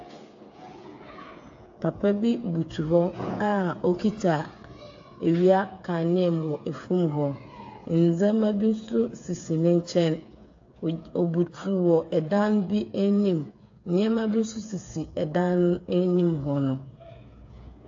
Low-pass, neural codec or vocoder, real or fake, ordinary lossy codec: 7.2 kHz; codec, 16 kHz, 8 kbps, FreqCodec, smaller model; fake; AAC, 48 kbps